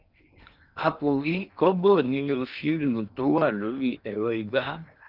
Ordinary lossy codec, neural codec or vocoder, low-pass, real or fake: Opus, 24 kbps; codec, 16 kHz in and 24 kHz out, 0.8 kbps, FocalCodec, streaming, 65536 codes; 5.4 kHz; fake